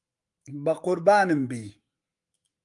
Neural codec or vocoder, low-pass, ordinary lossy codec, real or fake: autoencoder, 48 kHz, 128 numbers a frame, DAC-VAE, trained on Japanese speech; 10.8 kHz; Opus, 32 kbps; fake